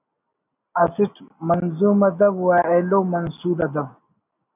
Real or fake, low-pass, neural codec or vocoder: real; 3.6 kHz; none